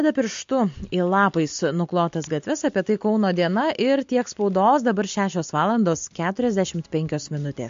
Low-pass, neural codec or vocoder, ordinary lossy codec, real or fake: 7.2 kHz; none; MP3, 48 kbps; real